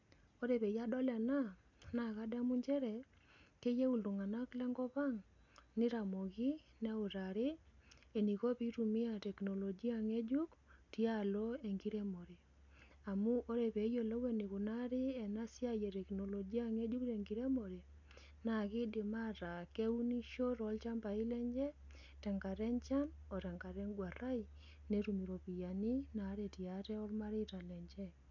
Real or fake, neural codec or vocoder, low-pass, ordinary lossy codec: real; none; 7.2 kHz; none